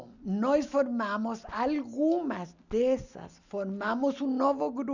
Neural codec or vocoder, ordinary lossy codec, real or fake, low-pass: none; none; real; 7.2 kHz